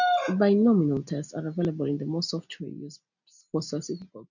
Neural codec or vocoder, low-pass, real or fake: none; 7.2 kHz; real